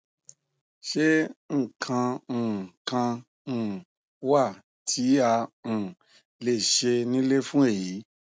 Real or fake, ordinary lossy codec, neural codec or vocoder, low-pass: real; none; none; none